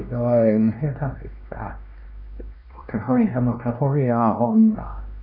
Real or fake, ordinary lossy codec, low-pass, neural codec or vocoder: fake; none; 5.4 kHz; codec, 16 kHz, 1 kbps, X-Codec, WavLM features, trained on Multilingual LibriSpeech